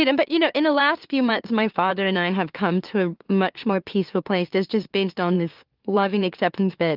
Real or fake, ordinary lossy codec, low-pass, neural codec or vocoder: fake; Opus, 32 kbps; 5.4 kHz; autoencoder, 44.1 kHz, a latent of 192 numbers a frame, MeloTTS